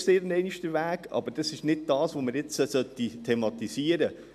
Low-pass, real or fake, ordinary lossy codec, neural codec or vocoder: 14.4 kHz; real; AAC, 96 kbps; none